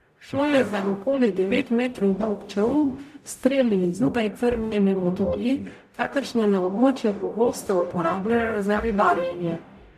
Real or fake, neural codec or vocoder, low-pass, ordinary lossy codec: fake; codec, 44.1 kHz, 0.9 kbps, DAC; 14.4 kHz; none